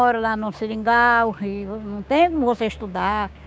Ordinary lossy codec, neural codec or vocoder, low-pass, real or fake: none; codec, 16 kHz, 6 kbps, DAC; none; fake